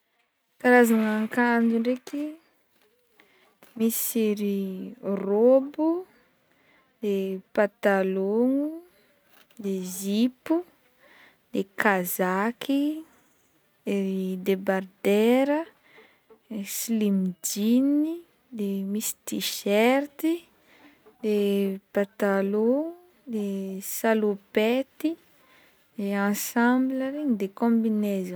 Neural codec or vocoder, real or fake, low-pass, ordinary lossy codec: none; real; none; none